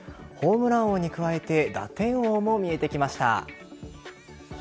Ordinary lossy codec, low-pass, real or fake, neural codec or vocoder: none; none; real; none